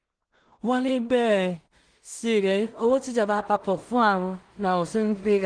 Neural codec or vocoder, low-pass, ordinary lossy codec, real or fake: codec, 16 kHz in and 24 kHz out, 0.4 kbps, LongCat-Audio-Codec, two codebook decoder; 9.9 kHz; Opus, 24 kbps; fake